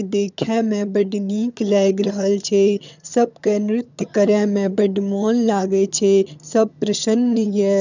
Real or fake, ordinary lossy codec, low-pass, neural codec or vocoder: fake; none; 7.2 kHz; vocoder, 22.05 kHz, 80 mel bands, HiFi-GAN